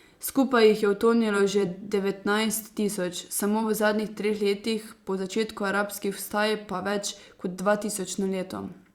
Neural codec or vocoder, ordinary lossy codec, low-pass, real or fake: vocoder, 44.1 kHz, 128 mel bands every 256 samples, BigVGAN v2; Opus, 64 kbps; 19.8 kHz; fake